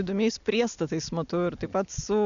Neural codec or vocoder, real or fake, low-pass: none; real; 7.2 kHz